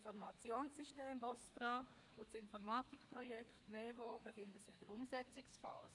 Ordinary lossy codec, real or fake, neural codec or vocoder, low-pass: none; fake; codec, 24 kHz, 1 kbps, SNAC; 10.8 kHz